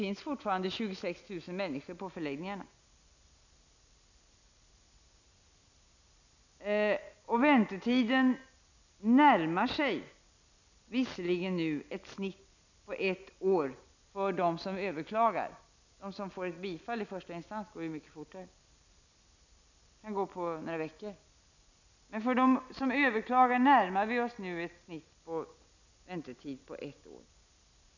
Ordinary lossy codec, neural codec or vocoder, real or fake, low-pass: none; none; real; 7.2 kHz